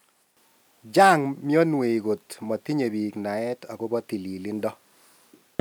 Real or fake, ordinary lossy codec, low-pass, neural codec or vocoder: real; none; none; none